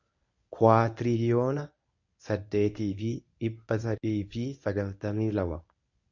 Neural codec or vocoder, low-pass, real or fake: codec, 24 kHz, 0.9 kbps, WavTokenizer, medium speech release version 1; 7.2 kHz; fake